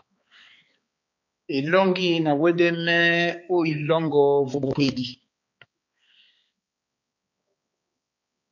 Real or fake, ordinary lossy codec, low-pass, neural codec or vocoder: fake; MP3, 48 kbps; 7.2 kHz; codec, 16 kHz, 4 kbps, X-Codec, HuBERT features, trained on balanced general audio